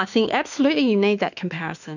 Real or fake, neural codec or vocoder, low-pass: fake; autoencoder, 48 kHz, 32 numbers a frame, DAC-VAE, trained on Japanese speech; 7.2 kHz